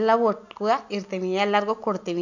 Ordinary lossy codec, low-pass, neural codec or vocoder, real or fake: none; 7.2 kHz; none; real